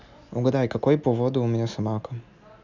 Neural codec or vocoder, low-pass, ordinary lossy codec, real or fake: none; 7.2 kHz; none; real